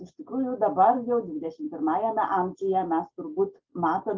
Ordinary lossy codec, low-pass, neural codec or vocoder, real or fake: Opus, 32 kbps; 7.2 kHz; none; real